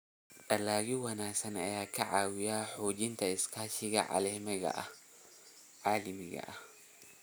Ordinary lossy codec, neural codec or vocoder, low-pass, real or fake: none; none; none; real